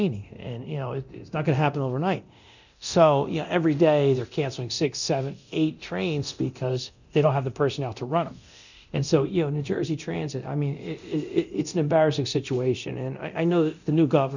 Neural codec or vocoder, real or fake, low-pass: codec, 24 kHz, 0.9 kbps, DualCodec; fake; 7.2 kHz